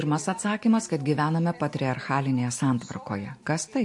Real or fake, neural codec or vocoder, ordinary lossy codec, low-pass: real; none; MP3, 48 kbps; 10.8 kHz